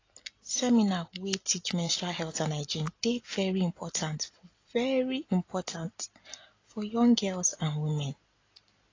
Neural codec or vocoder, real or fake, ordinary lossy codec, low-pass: none; real; AAC, 32 kbps; 7.2 kHz